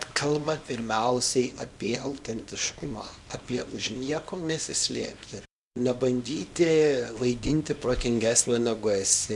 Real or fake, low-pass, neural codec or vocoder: fake; 10.8 kHz; codec, 24 kHz, 0.9 kbps, WavTokenizer, small release